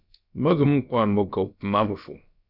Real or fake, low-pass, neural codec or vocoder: fake; 5.4 kHz; codec, 16 kHz, about 1 kbps, DyCAST, with the encoder's durations